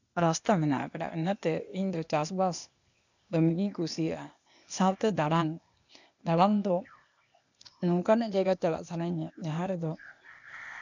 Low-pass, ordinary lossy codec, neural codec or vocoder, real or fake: 7.2 kHz; none; codec, 16 kHz, 0.8 kbps, ZipCodec; fake